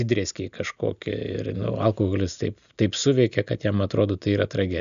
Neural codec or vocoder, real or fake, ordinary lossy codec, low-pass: none; real; AAC, 96 kbps; 7.2 kHz